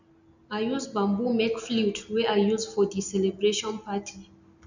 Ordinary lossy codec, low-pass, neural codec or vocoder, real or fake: none; 7.2 kHz; none; real